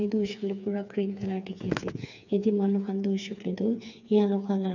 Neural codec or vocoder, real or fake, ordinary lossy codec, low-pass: codec, 16 kHz, 4 kbps, FreqCodec, smaller model; fake; none; 7.2 kHz